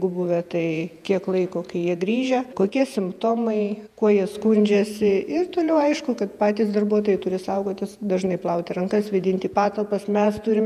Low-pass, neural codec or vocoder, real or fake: 14.4 kHz; vocoder, 48 kHz, 128 mel bands, Vocos; fake